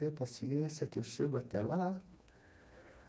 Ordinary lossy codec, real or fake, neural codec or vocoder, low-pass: none; fake; codec, 16 kHz, 2 kbps, FreqCodec, smaller model; none